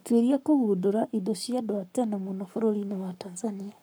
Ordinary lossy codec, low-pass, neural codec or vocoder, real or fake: none; none; codec, 44.1 kHz, 7.8 kbps, Pupu-Codec; fake